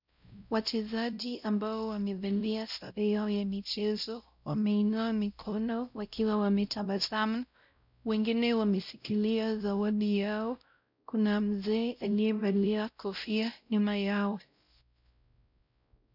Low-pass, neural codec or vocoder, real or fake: 5.4 kHz; codec, 16 kHz, 0.5 kbps, X-Codec, WavLM features, trained on Multilingual LibriSpeech; fake